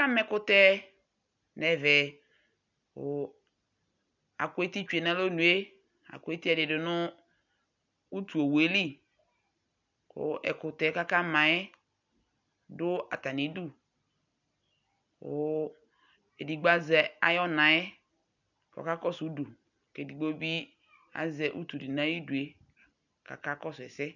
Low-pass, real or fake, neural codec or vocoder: 7.2 kHz; real; none